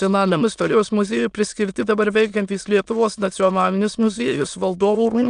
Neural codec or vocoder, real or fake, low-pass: autoencoder, 22.05 kHz, a latent of 192 numbers a frame, VITS, trained on many speakers; fake; 9.9 kHz